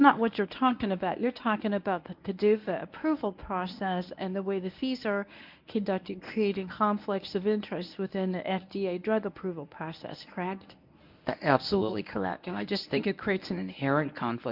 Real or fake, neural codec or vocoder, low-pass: fake; codec, 24 kHz, 0.9 kbps, WavTokenizer, medium speech release version 1; 5.4 kHz